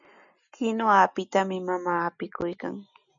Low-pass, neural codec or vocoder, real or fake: 7.2 kHz; none; real